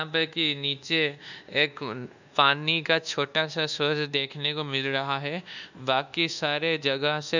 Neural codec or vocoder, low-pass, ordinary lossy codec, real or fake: codec, 24 kHz, 1.2 kbps, DualCodec; 7.2 kHz; none; fake